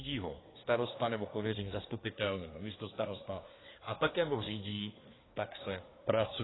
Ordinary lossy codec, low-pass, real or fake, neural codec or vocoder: AAC, 16 kbps; 7.2 kHz; fake; codec, 24 kHz, 1 kbps, SNAC